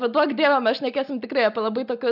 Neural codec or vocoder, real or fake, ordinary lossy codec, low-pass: none; real; AAC, 48 kbps; 5.4 kHz